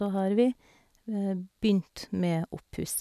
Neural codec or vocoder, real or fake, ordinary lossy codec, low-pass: none; real; none; 14.4 kHz